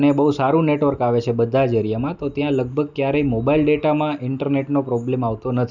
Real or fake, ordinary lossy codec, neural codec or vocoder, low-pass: real; none; none; 7.2 kHz